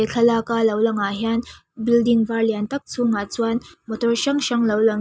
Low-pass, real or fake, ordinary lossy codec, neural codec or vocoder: none; real; none; none